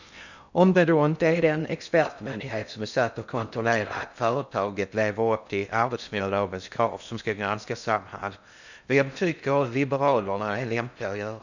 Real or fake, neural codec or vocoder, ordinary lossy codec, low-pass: fake; codec, 16 kHz in and 24 kHz out, 0.6 kbps, FocalCodec, streaming, 2048 codes; none; 7.2 kHz